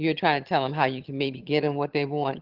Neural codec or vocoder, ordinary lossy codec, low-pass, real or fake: vocoder, 22.05 kHz, 80 mel bands, HiFi-GAN; Opus, 16 kbps; 5.4 kHz; fake